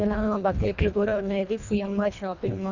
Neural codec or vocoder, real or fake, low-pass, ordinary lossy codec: codec, 24 kHz, 1.5 kbps, HILCodec; fake; 7.2 kHz; none